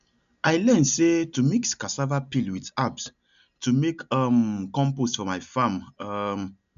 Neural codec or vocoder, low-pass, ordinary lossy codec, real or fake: none; 7.2 kHz; none; real